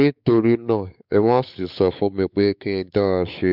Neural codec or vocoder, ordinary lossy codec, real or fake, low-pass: codec, 16 kHz, 4 kbps, X-Codec, WavLM features, trained on Multilingual LibriSpeech; none; fake; 5.4 kHz